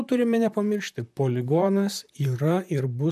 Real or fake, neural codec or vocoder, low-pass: fake; vocoder, 44.1 kHz, 128 mel bands, Pupu-Vocoder; 14.4 kHz